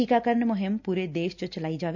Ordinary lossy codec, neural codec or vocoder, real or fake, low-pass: none; none; real; 7.2 kHz